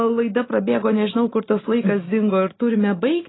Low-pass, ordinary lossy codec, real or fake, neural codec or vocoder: 7.2 kHz; AAC, 16 kbps; real; none